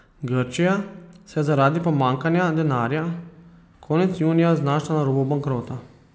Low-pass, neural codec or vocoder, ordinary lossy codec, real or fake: none; none; none; real